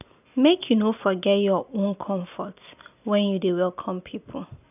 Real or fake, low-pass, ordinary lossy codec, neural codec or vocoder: real; 3.6 kHz; none; none